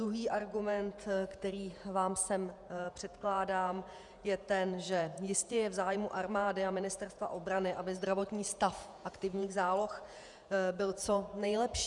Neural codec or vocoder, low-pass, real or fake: vocoder, 48 kHz, 128 mel bands, Vocos; 10.8 kHz; fake